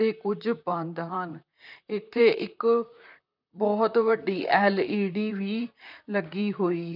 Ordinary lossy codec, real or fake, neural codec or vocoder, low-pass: none; fake; vocoder, 44.1 kHz, 128 mel bands, Pupu-Vocoder; 5.4 kHz